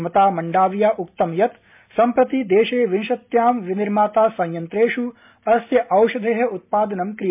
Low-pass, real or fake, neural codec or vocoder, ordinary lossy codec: 3.6 kHz; real; none; MP3, 32 kbps